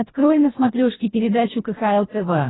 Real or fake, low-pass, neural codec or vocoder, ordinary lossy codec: fake; 7.2 kHz; codec, 24 kHz, 1.5 kbps, HILCodec; AAC, 16 kbps